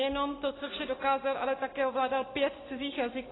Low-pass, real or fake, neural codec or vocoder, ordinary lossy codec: 7.2 kHz; real; none; AAC, 16 kbps